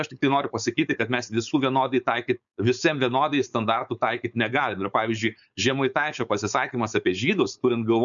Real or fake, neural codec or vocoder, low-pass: fake; codec, 16 kHz, 4.8 kbps, FACodec; 7.2 kHz